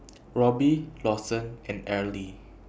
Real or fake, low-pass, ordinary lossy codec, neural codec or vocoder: real; none; none; none